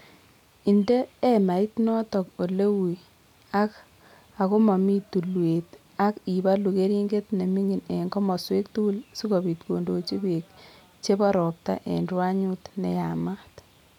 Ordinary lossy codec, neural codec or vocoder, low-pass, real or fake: none; none; 19.8 kHz; real